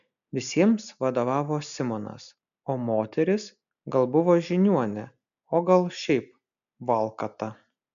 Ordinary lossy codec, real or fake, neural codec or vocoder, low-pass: MP3, 96 kbps; real; none; 7.2 kHz